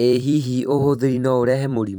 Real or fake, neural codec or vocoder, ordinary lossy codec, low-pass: fake; vocoder, 44.1 kHz, 128 mel bands every 256 samples, BigVGAN v2; none; none